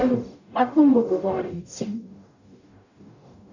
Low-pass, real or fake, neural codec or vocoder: 7.2 kHz; fake; codec, 44.1 kHz, 0.9 kbps, DAC